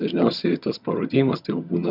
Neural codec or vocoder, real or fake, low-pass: vocoder, 22.05 kHz, 80 mel bands, HiFi-GAN; fake; 5.4 kHz